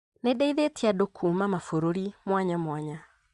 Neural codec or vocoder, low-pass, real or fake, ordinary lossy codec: none; 10.8 kHz; real; Opus, 64 kbps